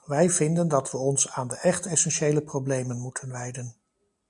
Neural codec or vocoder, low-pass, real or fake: none; 10.8 kHz; real